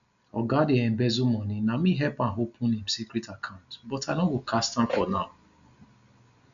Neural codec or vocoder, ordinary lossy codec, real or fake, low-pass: none; none; real; 7.2 kHz